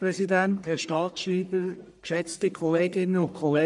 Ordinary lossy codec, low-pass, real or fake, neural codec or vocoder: none; 10.8 kHz; fake; codec, 44.1 kHz, 1.7 kbps, Pupu-Codec